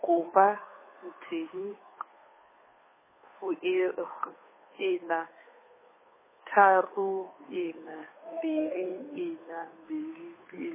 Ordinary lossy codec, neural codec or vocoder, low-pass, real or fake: MP3, 16 kbps; codec, 16 kHz, 2 kbps, FunCodec, trained on Chinese and English, 25 frames a second; 3.6 kHz; fake